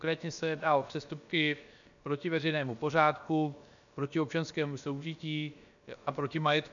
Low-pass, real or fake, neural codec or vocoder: 7.2 kHz; fake; codec, 16 kHz, about 1 kbps, DyCAST, with the encoder's durations